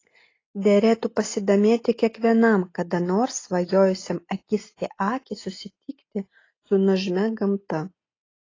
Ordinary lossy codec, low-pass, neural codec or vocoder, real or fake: AAC, 32 kbps; 7.2 kHz; none; real